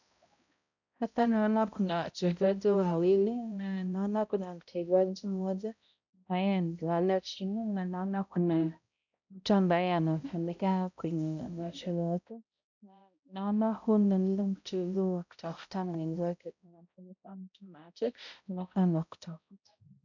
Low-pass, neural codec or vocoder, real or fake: 7.2 kHz; codec, 16 kHz, 0.5 kbps, X-Codec, HuBERT features, trained on balanced general audio; fake